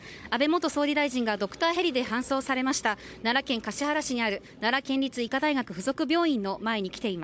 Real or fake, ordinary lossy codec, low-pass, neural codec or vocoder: fake; none; none; codec, 16 kHz, 16 kbps, FunCodec, trained on Chinese and English, 50 frames a second